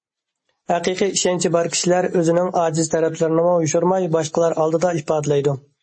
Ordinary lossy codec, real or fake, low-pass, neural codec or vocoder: MP3, 32 kbps; real; 9.9 kHz; none